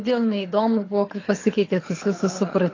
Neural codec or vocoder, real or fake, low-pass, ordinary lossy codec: codec, 24 kHz, 6 kbps, HILCodec; fake; 7.2 kHz; AAC, 32 kbps